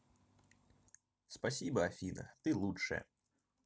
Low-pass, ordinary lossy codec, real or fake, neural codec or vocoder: none; none; real; none